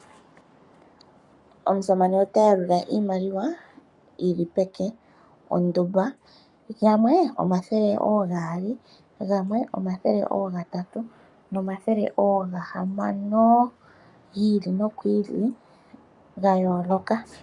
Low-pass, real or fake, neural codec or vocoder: 10.8 kHz; fake; codec, 44.1 kHz, 7.8 kbps, Pupu-Codec